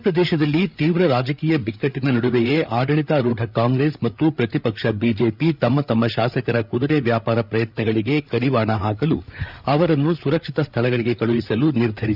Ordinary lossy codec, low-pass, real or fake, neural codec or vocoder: none; 5.4 kHz; fake; codec, 16 kHz, 8 kbps, FreqCodec, larger model